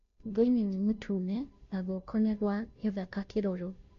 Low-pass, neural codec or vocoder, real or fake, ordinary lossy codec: 7.2 kHz; codec, 16 kHz, 0.5 kbps, FunCodec, trained on Chinese and English, 25 frames a second; fake; MP3, 48 kbps